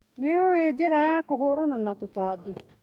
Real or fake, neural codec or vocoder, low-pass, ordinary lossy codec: fake; codec, 44.1 kHz, 2.6 kbps, DAC; 19.8 kHz; none